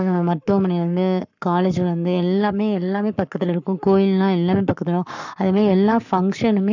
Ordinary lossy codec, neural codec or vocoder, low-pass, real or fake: none; codec, 44.1 kHz, 7.8 kbps, Pupu-Codec; 7.2 kHz; fake